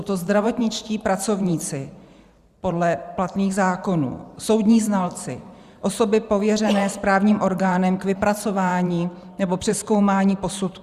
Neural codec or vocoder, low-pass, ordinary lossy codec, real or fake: vocoder, 44.1 kHz, 128 mel bands every 512 samples, BigVGAN v2; 14.4 kHz; Opus, 64 kbps; fake